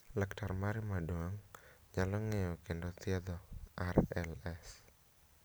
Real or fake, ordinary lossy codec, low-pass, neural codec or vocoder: real; none; none; none